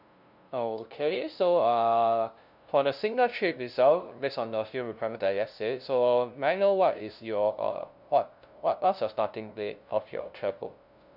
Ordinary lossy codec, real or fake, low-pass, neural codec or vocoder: none; fake; 5.4 kHz; codec, 16 kHz, 0.5 kbps, FunCodec, trained on LibriTTS, 25 frames a second